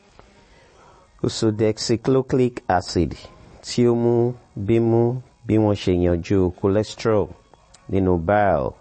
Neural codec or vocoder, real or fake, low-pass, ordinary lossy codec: none; real; 10.8 kHz; MP3, 32 kbps